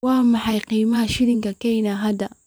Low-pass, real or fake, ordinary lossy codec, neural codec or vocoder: none; fake; none; vocoder, 44.1 kHz, 128 mel bands every 512 samples, BigVGAN v2